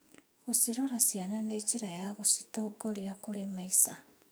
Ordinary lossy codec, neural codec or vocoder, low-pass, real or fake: none; codec, 44.1 kHz, 2.6 kbps, SNAC; none; fake